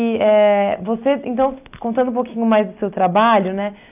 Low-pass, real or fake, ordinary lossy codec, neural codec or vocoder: 3.6 kHz; real; none; none